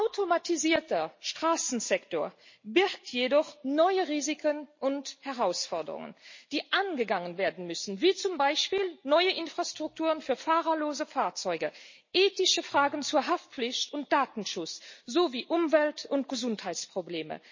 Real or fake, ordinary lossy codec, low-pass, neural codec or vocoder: real; MP3, 32 kbps; 7.2 kHz; none